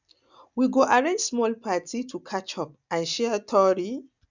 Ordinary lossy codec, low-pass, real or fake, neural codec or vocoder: none; 7.2 kHz; real; none